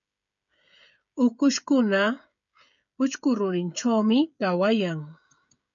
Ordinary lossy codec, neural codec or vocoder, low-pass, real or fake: MP3, 96 kbps; codec, 16 kHz, 16 kbps, FreqCodec, smaller model; 7.2 kHz; fake